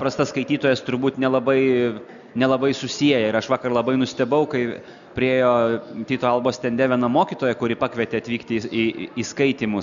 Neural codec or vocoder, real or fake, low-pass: none; real; 7.2 kHz